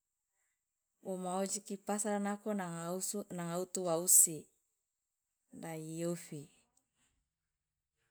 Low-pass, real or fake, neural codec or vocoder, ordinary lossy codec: none; real; none; none